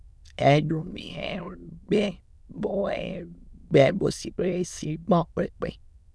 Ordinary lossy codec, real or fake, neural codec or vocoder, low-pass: none; fake; autoencoder, 22.05 kHz, a latent of 192 numbers a frame, VITS, trained on many speakers; none